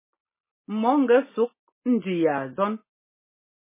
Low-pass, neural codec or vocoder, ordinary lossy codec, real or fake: 3.6 kHz; none; MP3, 16 kbps; real